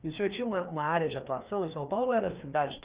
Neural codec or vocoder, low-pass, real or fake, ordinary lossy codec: codec, 16 kHz, 2 kbps, FreqCodec, larger model; 3.6 kHz; fake; Opus, 64 kbps